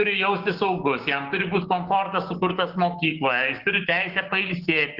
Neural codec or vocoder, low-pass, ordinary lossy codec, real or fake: codec, 16 kHz, 6 kbps, DAC; 5.4 kHz; Opus, 16 kbps; fake